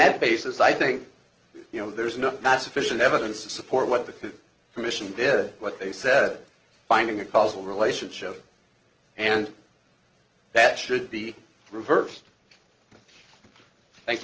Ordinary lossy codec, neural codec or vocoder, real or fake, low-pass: Opus, 16 kbps; none; real; 7.2 kHz